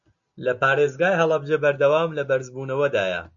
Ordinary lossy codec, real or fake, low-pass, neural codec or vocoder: MP3, 48 kbps; real; 7.2 kHz; none